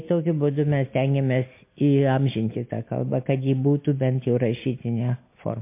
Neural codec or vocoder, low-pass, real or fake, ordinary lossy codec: none; 3.6 kHz; real; MP3, 24 kbps